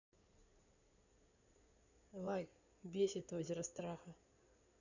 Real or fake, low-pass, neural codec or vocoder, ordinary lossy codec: fake; 7.2 kHz; codec, 16 kHz in and 24 kHz out, 2.2 kbps, FireRedTTS-2 codec; none